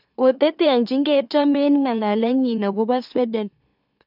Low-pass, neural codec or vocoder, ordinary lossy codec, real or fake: 5.4 kHz; autoencoder, 44.1 kHz, a latent of 192 numbers a frame, MeloTTS; none; fake